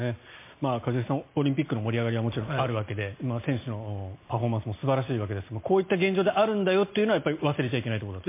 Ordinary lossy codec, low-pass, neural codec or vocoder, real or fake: MP3, 24 kbps; 3.6 kHz; none; real